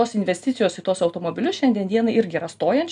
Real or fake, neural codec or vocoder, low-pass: real; none; 10.8 kHz